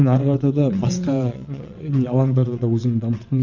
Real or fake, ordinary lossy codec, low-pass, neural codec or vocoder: fake; none; 7.2 kHz; vocoder, 22.05 kHz, 80 mel bands, WaveNeXt